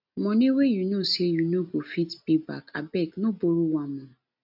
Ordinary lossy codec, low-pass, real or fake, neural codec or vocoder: none; 5.4 kHz; real; none